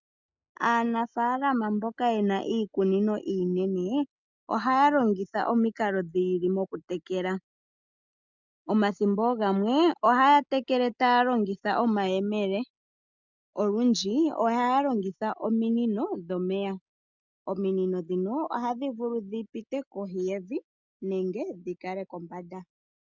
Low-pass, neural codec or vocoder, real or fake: 7.2 kHz; none; real